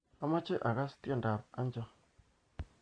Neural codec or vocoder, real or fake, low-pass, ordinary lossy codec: none; real; 9.9 kHz; none